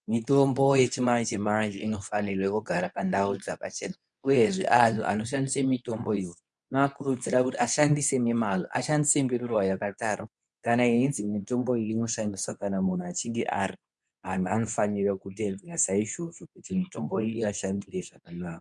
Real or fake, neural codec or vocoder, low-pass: fake; codec, 24 kHz, 0.9 kbps, WavTokenizer, medium speech release version 2; 10.8 kHz